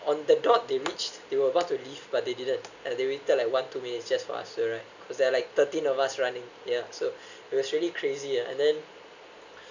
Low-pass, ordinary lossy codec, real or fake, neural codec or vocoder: 7.2 kHz; none; real; none